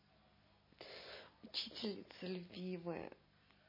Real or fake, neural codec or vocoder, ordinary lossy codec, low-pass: real; none; MP3, 24 kbps; 5.4 kHz